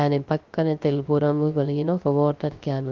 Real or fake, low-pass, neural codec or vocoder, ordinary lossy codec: fake; 7.2 kHz; codec, 16 kHz, 0.3 kbps, FocalCodec; Opus, 24 kbps